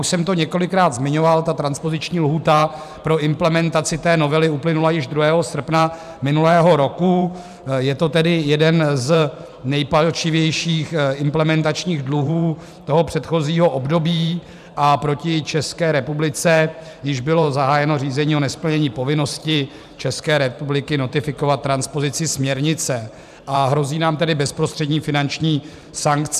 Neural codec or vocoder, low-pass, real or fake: vocoder, 44.1 kHz, 128 mel bands every 512 samples, BigVGAN v2; 14.4 kHz; fake